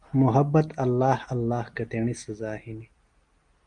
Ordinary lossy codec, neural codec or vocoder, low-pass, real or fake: Opus, 24 kbps; none; 9.9 kHz; real